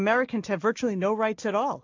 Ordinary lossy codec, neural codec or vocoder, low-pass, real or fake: AAC, 48 kbps; none; 7.2 kHz; real